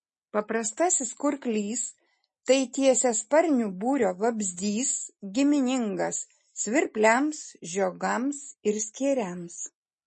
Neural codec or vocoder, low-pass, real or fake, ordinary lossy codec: none; 9.9 kHz; real; MP3, 32 kbps